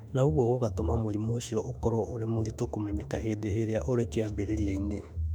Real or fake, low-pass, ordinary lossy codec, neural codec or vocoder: fake; 19.8 kHz; none; autoencoder, 48 kHz, 32 numbers a frame, DAC-VAE, trained on Japanese speech